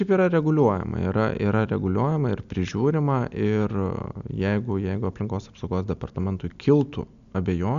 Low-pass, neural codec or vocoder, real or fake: 7.2 kHz; none; real